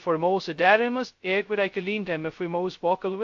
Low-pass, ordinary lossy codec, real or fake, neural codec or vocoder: 7.2 kHz; AAC, 48 kbps; fake; codec, 16 kHz, 0.2 kbps, FocalCodec